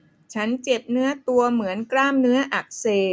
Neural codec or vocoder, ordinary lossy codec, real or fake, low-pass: none; none; real; none